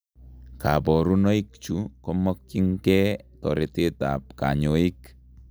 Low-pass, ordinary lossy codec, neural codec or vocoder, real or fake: none; none; none; real